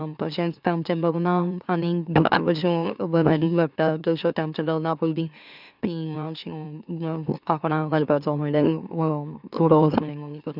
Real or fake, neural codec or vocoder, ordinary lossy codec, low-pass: fake; autoencoder, 44.1 kHz, a latent of 192 numbers a frame, MeloTTS; none; 5.4 kHz